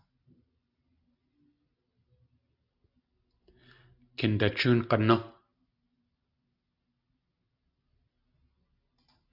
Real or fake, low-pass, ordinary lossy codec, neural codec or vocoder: real; 5.4 kHz; AAC, 48 kbps; none